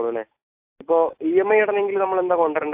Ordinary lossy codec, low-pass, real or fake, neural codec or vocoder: AAC, 32 kbps; 3.6 kHz; real; none